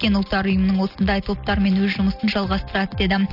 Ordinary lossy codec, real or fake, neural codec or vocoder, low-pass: none; real; none; 5.4 kHz